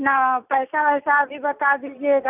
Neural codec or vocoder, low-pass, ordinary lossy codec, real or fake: none; 3.6 kHz; none; real